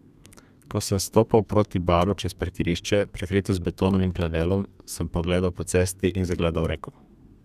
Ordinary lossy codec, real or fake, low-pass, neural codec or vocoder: none; fake; 14.4 kHz; codec, 32 kHz, 1.9 kbps, SNAC